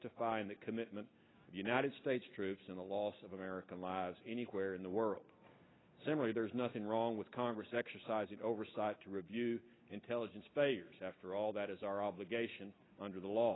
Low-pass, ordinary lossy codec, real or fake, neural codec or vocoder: 7.2 kHz; AAC, 16 kbps; real; none